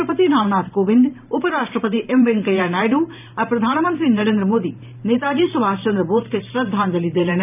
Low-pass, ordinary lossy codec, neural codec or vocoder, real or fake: 3.6 kHz; none; vocoder, 44.1 kHz, 128 mel bands every 512 samples, BigVGAN v2; fake